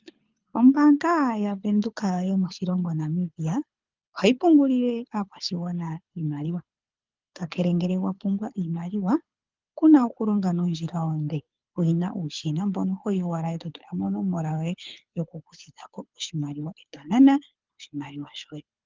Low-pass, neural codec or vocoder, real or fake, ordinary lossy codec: 7.2 kHz; codec, 24 kHz, 6 kbps, HILCodec; fake; Opus, 24 kbps